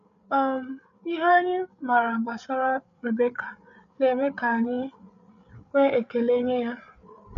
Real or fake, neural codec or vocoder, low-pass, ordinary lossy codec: fake; codec, 16 kHz, 8 kbps, FreqCodec, larger model; 7.2 kHz; none